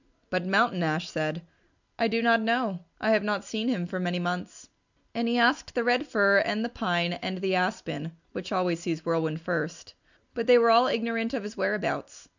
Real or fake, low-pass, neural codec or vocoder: real; 7.2 kHz; none